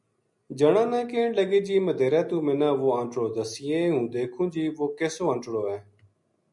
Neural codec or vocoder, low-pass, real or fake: none; 10.8 kHz; real